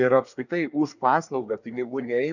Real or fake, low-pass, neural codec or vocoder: fake; 7.2 kHz; codec, 24 kHz, 1 kbps, SNAC